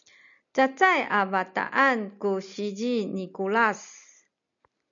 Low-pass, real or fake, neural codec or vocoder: 7.2 kHz; real; none